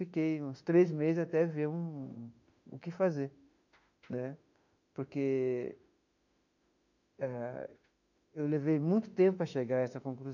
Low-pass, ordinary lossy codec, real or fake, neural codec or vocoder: 7.2 kHz; none; fake; autoencoder, 48 kHz, 32 numbers a frame, DAC-VAE, trained on Japanese speech